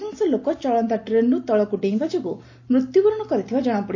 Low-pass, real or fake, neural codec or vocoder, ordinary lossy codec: 7.2 kHz; real; none; AAC, 48 kbps